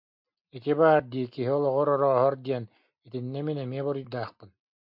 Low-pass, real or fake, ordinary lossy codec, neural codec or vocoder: 5.4 kHz; real; MP3, 48 kbps; none